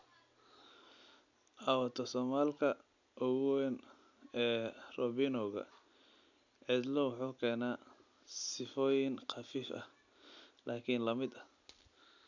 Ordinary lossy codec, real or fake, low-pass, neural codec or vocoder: none; real; 7.2 kHz; none